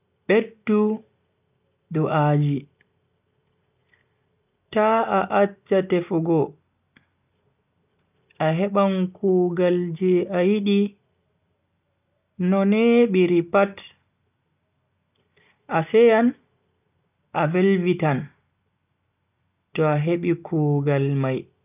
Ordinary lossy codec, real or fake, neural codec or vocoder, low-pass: none; real; none; 3.6 kHz